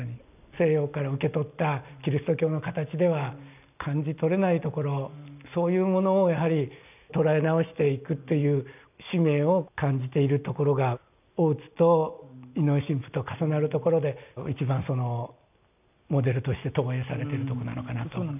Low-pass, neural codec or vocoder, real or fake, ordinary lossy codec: 3.6 kHz; none; real; none